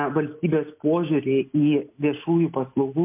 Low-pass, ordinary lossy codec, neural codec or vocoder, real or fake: 3.6 kHz; MP3, 32 kbps; none; real